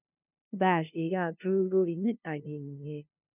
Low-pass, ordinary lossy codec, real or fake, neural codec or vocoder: 3.6 kHz; none; fake; codec, 16 kHz, 0.5 kbps, FunCodec, trained on LibriTTS, 25 frames a second